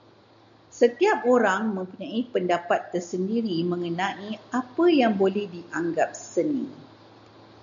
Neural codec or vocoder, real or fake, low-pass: none; real; 7.2 kHz